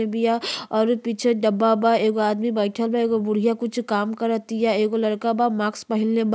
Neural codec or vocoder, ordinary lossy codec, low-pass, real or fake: none; none; none; real